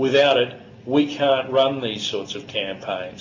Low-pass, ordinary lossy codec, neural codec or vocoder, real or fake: 7.2 kHz; MP3, 64 kbps; none; real